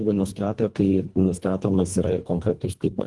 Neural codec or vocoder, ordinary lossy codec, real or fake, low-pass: codec, 24 kHz, 1.5 kbps, HILCodec; Opus, 24 kbps; fake; 10.8 kHz